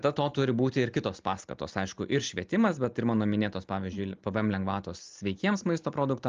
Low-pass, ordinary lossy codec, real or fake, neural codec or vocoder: 7.2 kHz; Opus, 24 kbps; real; none